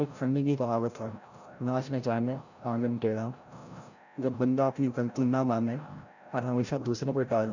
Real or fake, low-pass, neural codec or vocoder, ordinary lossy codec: fake; 7.2 kHz; codec, 16 kHz, 0.5 kbps, FreqCodec, larger model; none